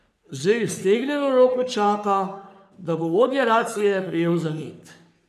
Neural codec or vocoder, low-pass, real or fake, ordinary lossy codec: codec, 44.1 kHz, 3.4 kbps, Pupu-Codec; 14.4 kHz; fake; none